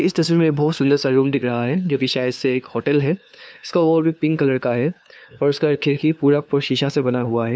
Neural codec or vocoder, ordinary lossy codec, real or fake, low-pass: codec, 16 kHz, 2 kbps, FunCodec, trained on LibriTTS, 25 frames a second; none; fake; none